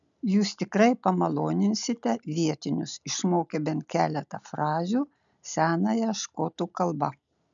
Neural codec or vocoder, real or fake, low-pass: none; real; 7.2 kHz